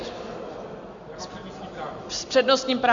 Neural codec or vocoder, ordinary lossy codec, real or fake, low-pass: none; MP3, 64 kbps; real; 7.2 kHz